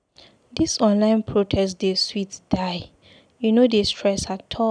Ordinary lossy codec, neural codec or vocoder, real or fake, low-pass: none; none; real; 9.9 kHz